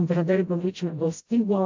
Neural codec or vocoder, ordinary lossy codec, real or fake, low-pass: codec, 16 kHz, 0.5 kbps, FreqCodec, smaller model; AAC, 48 kbps; fake; 7.2 kHz